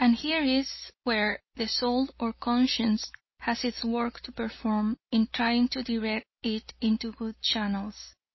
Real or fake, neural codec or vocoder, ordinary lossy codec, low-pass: real; none; MP3, 24 kbps; 7.2 kHz